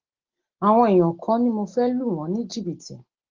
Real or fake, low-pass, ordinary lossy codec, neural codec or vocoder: real; 7.2 kHz; Opus, 16 kbps; none